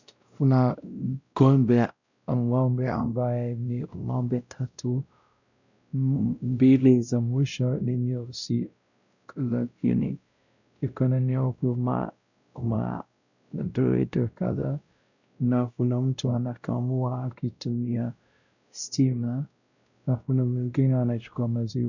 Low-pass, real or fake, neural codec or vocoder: 7.2 kHz; fake; codec, 16 kHz, 0.5 kbps, X-Codec, WavLM features, trained on Multilingual LibriSpeech